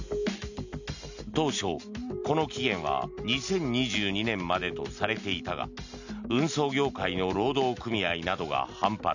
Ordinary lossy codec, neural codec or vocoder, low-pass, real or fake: none; none; 7.2 kHz; real